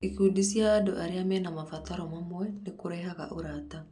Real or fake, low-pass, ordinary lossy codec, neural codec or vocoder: real; 10.8 kHz; none; none